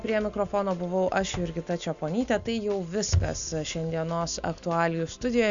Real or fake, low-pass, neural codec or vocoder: real; 7.2 kHz; none